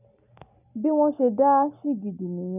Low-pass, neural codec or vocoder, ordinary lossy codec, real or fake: 3.6 kHz; none; none; real